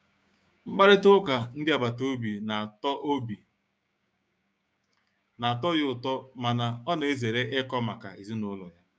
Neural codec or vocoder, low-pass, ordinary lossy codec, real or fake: codec, 16 kHz, 6 kbps, DAC; none; none; fake